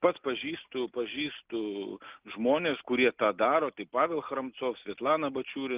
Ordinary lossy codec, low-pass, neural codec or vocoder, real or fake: Opus, 24 kbps; 3.6 kHz; none; real